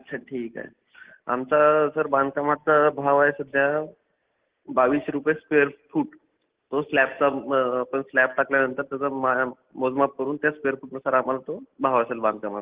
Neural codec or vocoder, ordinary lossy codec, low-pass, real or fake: none; Opus, 16 kbps; 3.6 kHz; real